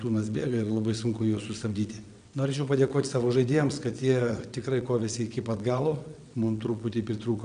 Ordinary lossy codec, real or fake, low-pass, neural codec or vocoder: MP3, 96 kbps; fake; 9.9 kHz; vocoder, 22.05 kHz, 80 mel bands, WaveNeXt